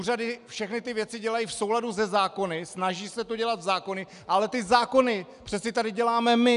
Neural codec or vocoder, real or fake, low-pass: none; real; 10.8 kHz